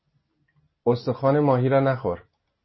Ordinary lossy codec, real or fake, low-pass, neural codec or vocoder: MP3, 24 kbps; real; 7.2 kHz; none